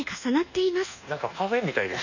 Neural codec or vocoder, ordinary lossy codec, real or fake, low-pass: codec, 24 kHz, 1.2 kbps, DualCodec; none; fake; 7.2 kHz